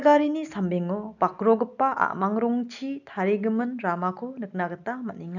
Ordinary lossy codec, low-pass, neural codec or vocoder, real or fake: none; 7.2 kHz; none; real